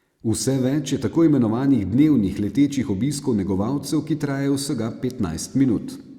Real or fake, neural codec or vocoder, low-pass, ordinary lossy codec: real; none; 19.8 kHz; Opus, 64 kbps